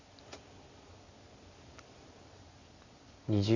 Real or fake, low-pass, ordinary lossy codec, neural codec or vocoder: real; 7.2 kHz; Opus, 64 kbps; none